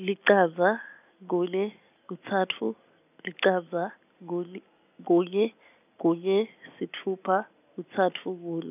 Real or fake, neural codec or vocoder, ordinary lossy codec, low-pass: real; none; none; 3.6 kHz